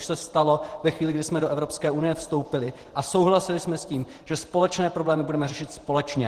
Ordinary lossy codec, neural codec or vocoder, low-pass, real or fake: Opus, 16 kbps; vocoder, 48 kHz, 128 mel bands, Vocos; 14.4 kHz; fake